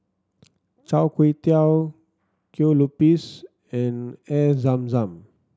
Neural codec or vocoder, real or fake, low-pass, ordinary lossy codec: none; real; none; none